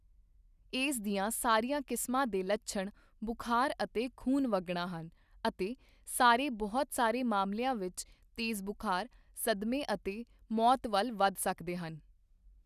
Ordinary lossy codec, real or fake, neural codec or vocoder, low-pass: none; real; none; 14.4 kHz